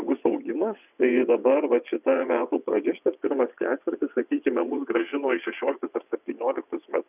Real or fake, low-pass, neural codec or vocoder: fake; 3.6 kHz; vocoder, 22.05 kHz, 80 mel bands, WaveNeXt